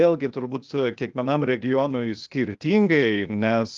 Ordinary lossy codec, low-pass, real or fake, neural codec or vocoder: Opus, 32 kbps; 7.2 kHz; fake; codec, 16 kHz, 0.8 kbps, ZipCodec